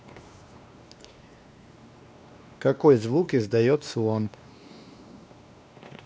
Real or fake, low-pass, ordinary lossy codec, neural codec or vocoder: fake; none; none; codec, 16 kHz, 2 kbps, X-Codec, WavLM features, trained on Multilingual LibriSpeech